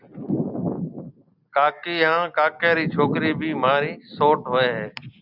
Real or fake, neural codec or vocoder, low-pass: real; none; 5.4 kHz